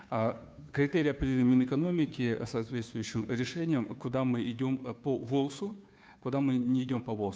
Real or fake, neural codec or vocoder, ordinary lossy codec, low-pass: fake; codec, 16 kHz, 2 kbps, FunCodec, trained on Chinese and English, 25 frames a second; none; none